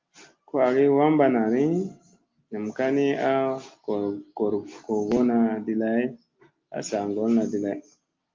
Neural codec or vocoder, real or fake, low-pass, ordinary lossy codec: none; real; 7.2 kHz; Opus, 24 kbps